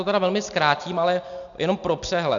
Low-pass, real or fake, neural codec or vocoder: 7.2 kHz; real; none